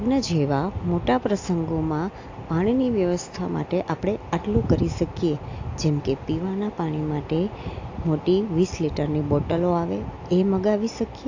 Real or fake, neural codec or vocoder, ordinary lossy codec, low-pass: real; none; AAC, 48 kbps; 7.2 kHz